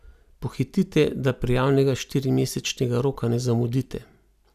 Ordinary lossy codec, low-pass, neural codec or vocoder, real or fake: none; 14.4 kHz; none; real